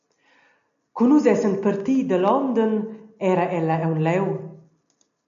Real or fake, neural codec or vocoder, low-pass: real; none; 7.2 kHz